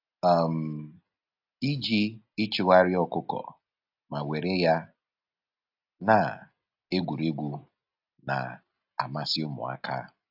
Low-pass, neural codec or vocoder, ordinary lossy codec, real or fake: 5.4 kHz; none; AAC, 48 kbps; real